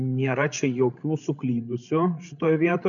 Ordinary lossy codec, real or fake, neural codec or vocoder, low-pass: MP3, 96 kbps; fake; codec, 16 kHz, 16 kbps, FreqCodec, larger model; 7.2 kHz